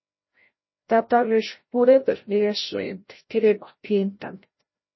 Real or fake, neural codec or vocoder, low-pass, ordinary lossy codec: fake; codec, 16 kHz, 0.5 kbps, FreqCodec, larger model; 7.2 kHz; MP3, 24 kbps